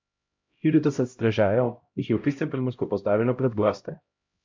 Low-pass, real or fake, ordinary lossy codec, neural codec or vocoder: 7.2 kHz; fake; MP3, 48 kbps; codec, 16 kHz, 0.5 kbps, X-Codec, HuBERT features, trained on LibriSpeech